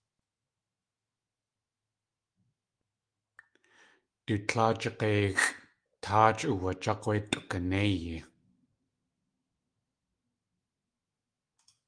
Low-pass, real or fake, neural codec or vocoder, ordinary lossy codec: 9.9 kHz; fake; autoencoder, 48 kHz, 128 numbers a frame, DAC-VAE, trained on Japanese speech; Opus, 32 kbps